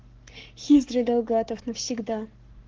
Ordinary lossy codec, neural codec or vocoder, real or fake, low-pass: Opus, 16 kbps; codec, 44.1 kHz, 7.8 kbps, Pupu-Codec; fake; 7.2 kHz